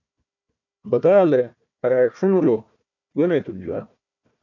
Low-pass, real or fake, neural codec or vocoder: 7.2 kHz; fake; codec, 16 kHz, 1 kbps, FunCodec, trained on Chinese and English, 50 frames a second